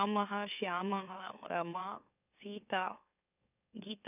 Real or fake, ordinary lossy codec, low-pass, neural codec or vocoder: fake; none; 3.6 kHz; autoencoder, 44.1 kHz, a latent of 192 numbers a frame, MeloTTS